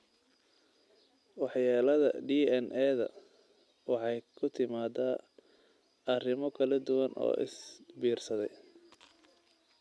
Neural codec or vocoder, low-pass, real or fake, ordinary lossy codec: none; none; real; none